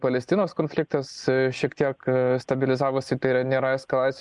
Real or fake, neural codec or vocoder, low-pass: real; none; 10.8 kHz